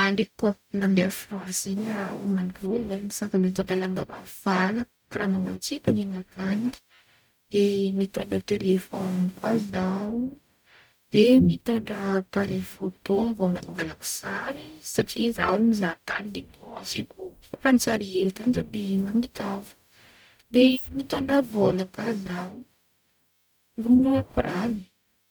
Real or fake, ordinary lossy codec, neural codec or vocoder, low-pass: fake; none; codec, 44.1 kHz, 0.9 kbps, DAC; none